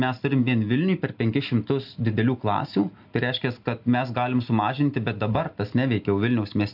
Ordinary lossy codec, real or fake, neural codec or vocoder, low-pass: AAC, 48 kbps; real; none; 5.4 kHz